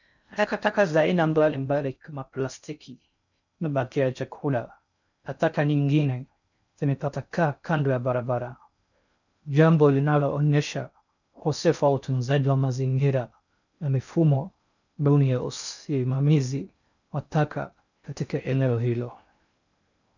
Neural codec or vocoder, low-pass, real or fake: codec, 16 kHz in and 24 kHz out, 0.6 kbps, FocalCodec, streaming, 2048 codes; 7.2 kHz; fake